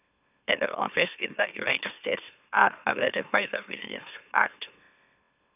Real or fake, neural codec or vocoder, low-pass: fake; autoencoder, 44.1 kHz, a latent of 192 numbers a frame, MeloTTS; 3.6 kHz